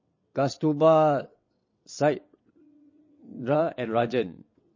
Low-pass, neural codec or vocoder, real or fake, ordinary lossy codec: 7.2 kHz; vocoder, 22.05 kHz, 80 mel bands, WaveNeXt; fake; MP3, 32 kbps